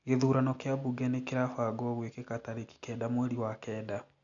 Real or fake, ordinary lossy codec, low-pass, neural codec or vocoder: real; none; 7.2 kHz; none